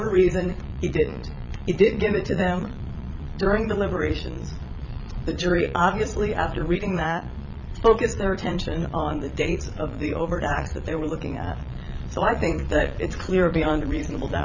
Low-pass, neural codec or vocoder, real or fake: 7.2 kHz; vocoder, 22.05 kHz, 80 mel bands, Vocos; fake